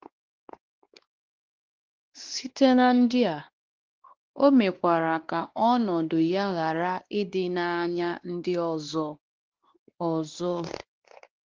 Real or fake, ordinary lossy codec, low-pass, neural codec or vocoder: fake; Opus, 16 kbps; 7.2 kHz; codec, 16 kHz, 2 kbps, X-Codec, WavLM features, trained on Multilingual LibriSpeech